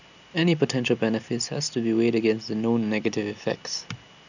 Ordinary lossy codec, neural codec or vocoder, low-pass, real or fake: none; none; 7.2 kHz; real